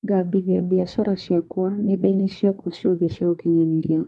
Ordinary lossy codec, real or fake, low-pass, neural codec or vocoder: none; fake; none; codec, 24 kHz, 1 kbps, SNAC